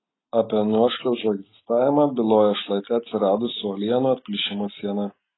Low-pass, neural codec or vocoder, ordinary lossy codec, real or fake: 7.2 kHz; none; AAC, 16 kbps; real